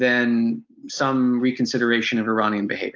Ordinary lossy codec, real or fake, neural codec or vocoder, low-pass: Opus, 32 kbps; real; none; 7.2 kHz